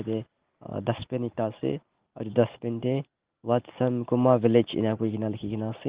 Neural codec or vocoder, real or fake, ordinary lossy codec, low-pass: none; real; Opus, 16 kbps; 3.6 kHz